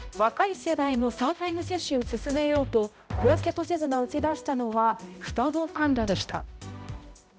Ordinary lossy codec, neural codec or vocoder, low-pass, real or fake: none; codec, 16 kHz, 0.5 kbps, X-Codec, HuBERT features, trained on balanced general audio; none; fake